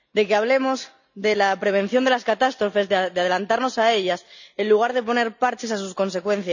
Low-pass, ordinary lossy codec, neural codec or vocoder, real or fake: 7.2 kHz; none; none; real